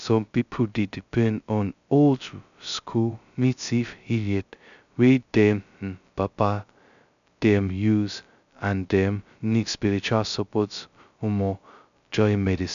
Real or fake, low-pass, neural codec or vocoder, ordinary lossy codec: fake; 7.2 kHz; codec, 16 kHz, 0.2 kbps, FocalCodec; none